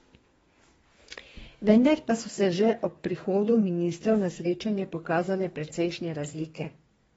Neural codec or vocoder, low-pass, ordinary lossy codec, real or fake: codec, 32 kHz, 1.9 kbps, SNAC; 14.4 kHz; AAC, 24 kbps; fake